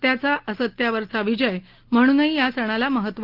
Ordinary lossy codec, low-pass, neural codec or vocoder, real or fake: Opus, 16 kbps; 5.4 kHz; none; real